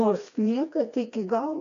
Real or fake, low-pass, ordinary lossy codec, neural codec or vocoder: fake; 7.2 kHz; AAC, 64 kbps; codec, 16 kHz, 2 kbps, FreqCodec, smaller model